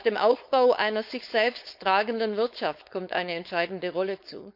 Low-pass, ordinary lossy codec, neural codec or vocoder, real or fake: 5.4 kHz; none; codec, 16 kHz, 4.8 kbps, FACodec; fake